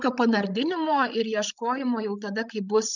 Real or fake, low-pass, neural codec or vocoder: fake; 7.2 kHz; codec, 16 kHz, 16 kbps, FreqCodec, larger model